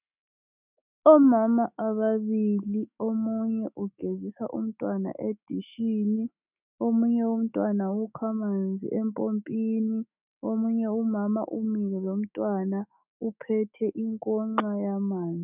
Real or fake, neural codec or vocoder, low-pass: fake; autoencoder, 48 kHz, 128 numbers a frame, DAC-VAE, trained on Japanese speech; 3.6 kHz